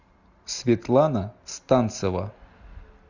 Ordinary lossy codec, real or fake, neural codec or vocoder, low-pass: Opus, 64 kbps; real; none; 7.2 kHz